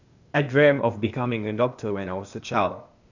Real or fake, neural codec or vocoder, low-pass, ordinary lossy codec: fake; codec, 16 kHz, 0.8 kbps, ZipCodec; 7.2 kHz; none